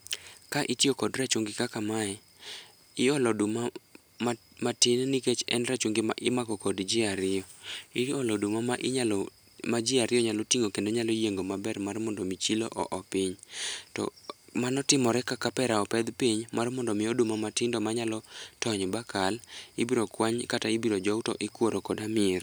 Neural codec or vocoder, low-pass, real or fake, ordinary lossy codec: none; none; real; none